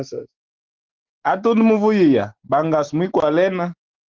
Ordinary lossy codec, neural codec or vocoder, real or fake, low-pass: Opus, 16 kbps; none; real; 7.2 kHz